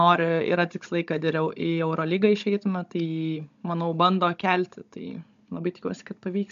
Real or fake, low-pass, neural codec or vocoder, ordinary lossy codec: fake; 7.2 kHz; codec, 16 kHz, 16 kbps, FunCodec, trained on Chinese and English, 50 frames a second; MP3, 64 kbps